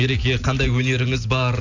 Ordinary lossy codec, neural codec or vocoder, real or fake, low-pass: none; none; real; 7.2 kHz